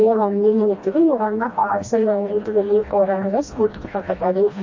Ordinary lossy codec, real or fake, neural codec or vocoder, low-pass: MP3, 32 kbps; fake; codec, 16 kHz, 1 kbps, FreqCodec, smaller model; 7.2 kHz